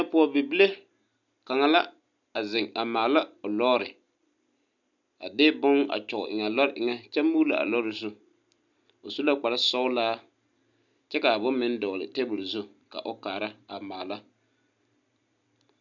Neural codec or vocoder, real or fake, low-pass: none; real; 7.2 kHz